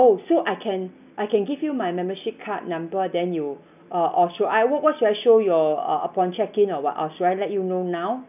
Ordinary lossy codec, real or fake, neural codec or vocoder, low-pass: none; real; none; 3.6 kHz